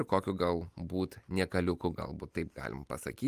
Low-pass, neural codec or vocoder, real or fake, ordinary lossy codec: 14.4 kHz; none; real; Opus, 32 kbps